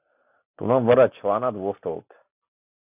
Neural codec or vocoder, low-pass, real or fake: none; 3.6 kHz; real